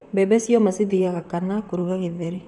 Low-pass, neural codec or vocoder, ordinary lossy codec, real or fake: 10.8 kHz; vocoder, 44.1 kHz, 128 mel bands, Pupu-Vocoder; none; fake